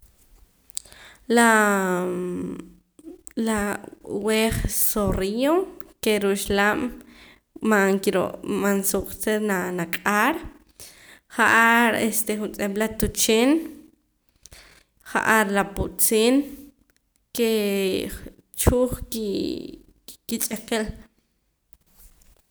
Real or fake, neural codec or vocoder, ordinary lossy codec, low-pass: real; none; none; none